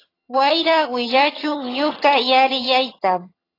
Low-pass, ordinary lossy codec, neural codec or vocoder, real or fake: 5.4 kHz; AAC, 24 kbps; vocoder, 22.05 kHz, 80 mel bands, HiFi-GAN; fake